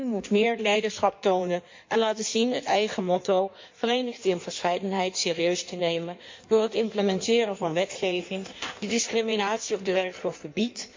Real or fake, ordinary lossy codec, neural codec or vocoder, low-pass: fake; MP3, 48 kbps; codec, 16 kHz in and 24 kHz out, 1.1 kbps, FireRedTTS-2 codec; 7.2 kHz